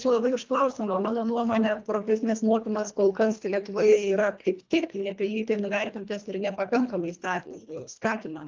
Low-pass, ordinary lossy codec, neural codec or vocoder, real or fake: 7.2 kHz; Opus, 32 kbps; codec, 24 kHz, 1.5 kbps, HILCodec; fake